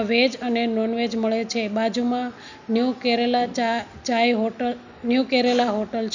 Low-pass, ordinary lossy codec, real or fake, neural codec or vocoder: 7.2 kHz; none; real; none